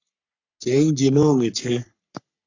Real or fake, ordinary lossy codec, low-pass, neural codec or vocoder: fake; AAC, 48 kbps; 7.2 kHz; codec, 44.1 kHz, 3.4 kbps, Pupu-Codec